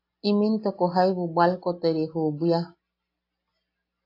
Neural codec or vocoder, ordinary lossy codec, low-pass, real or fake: none; AAC, 32 kbps; 5.4 kHz; real